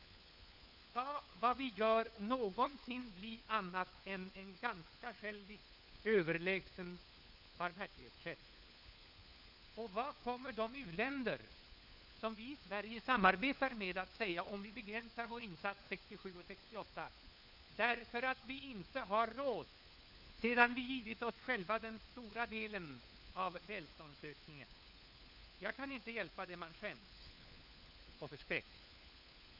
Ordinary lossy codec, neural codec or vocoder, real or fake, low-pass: none; codec, 16 kHz, 4 kbps, FunCodec, trained on LibriTTS, 50 frames a second; fake; 5.4 kHz